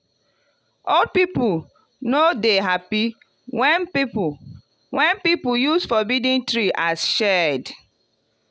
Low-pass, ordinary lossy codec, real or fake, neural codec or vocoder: none; none; real; none